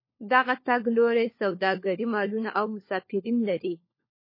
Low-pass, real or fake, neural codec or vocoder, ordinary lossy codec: 5.4 kHz; fake; codec, 16 kHz, 4 kbps, FunCodec, trained on LibriTTS, 50 frames a second; MP3, 24 kbps